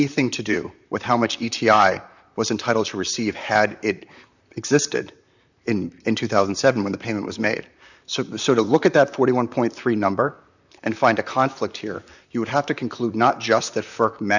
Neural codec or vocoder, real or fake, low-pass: vocoder, 44.1 kHz, 128 mel bands, Pupu-Vocoder; fake; 7.2 kHz